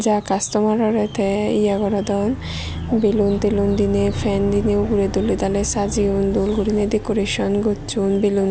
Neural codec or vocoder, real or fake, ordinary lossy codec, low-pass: none; real; none; none